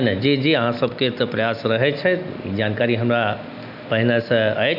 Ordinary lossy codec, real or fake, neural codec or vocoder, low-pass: none; real; none; 5.4 kHz